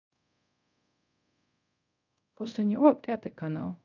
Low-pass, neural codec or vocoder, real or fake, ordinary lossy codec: 7.2 kHz; codec, 24 kHz, 0.5 kbps, DualCodec; fake; none